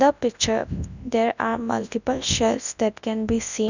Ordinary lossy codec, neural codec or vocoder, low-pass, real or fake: none; codec, 24 kHz, 0.9 kbps, WavTokenizer, large speech release; 7.2 kHz; fake